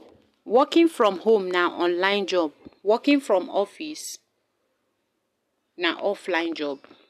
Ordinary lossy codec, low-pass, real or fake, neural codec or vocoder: none; 14.4 kHz; real; none